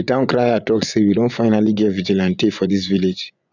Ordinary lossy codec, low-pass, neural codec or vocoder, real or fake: none; 7.2 kHz; vocoder, 24 kHz, 100 mel bands, Vocos; fake